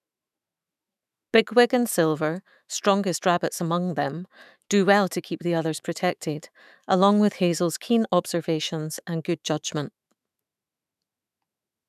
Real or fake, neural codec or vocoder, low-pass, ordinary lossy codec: fake; autoencoder, 48 kHz, 128 numbers a frame, DAC-VAE, trained on Japanese speech; 14.4 kHz; none